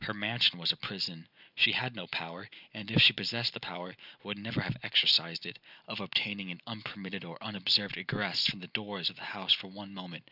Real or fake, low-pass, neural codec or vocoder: real; 5.4 kHz; none